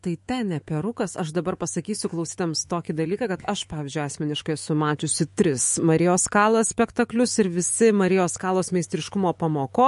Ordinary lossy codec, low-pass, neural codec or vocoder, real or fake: MP3, 48 kbps; 14.4 kHz; autoencoder, 48 kHz, 128 numbers a frame, DAC-VAE, trained on Japanese speech; fake